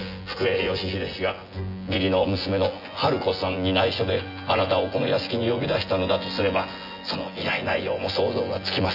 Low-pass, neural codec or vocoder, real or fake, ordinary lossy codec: 5.4 kHz; vocoder, 24 kHz, 100 mel bands, Vocos; fake; none